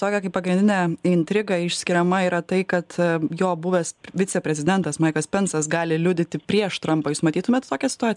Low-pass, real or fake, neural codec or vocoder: 10.8 kHz; real; none